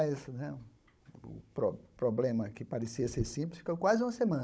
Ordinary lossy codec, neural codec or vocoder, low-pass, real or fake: none; codec, 16 kHz, 16 kbps, FunCodec, trained on Chinese and English, 50 frames a second; none; fake